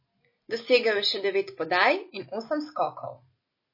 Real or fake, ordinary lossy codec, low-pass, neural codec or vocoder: real; MP3, 24 kbps; 5.4 kHz; none